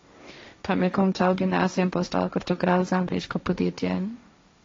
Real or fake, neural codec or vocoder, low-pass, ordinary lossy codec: fake; codec, 16 kHz, 1.1 kbps, Voila-Tokenizer; 7.2 kHz; AAC, 32 kbps